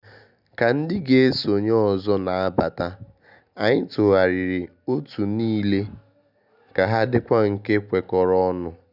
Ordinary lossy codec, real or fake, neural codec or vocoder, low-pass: none; real; none; 5.4 kHz